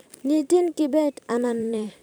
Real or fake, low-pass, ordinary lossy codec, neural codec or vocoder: fake; none; none; vocoder, 44.1 kHz, 128 mel bands every 512 samples, BigVGAN v2